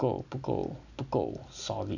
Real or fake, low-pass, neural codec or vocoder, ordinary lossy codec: real; 7.2 kHz; none; none